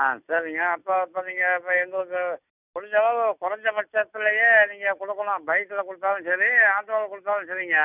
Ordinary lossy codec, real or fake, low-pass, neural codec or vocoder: none; real; 3.6 kHz; none